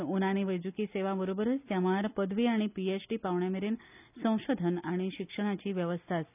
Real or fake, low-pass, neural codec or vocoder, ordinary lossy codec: real; 3.6 kHz; none; none